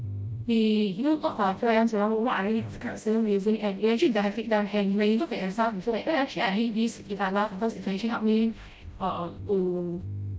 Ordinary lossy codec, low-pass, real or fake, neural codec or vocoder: none; none; fake; codec, 16 kHz, 0.5 kbps, FreqCodec, smaller model